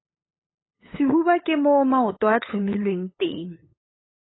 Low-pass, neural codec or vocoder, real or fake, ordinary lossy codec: 7.2 kHz; codec, 16 kHz, 8 kbps, FunCodec, trained on LibriTTS, 25 frames a second; fake; AAC, 16 kbps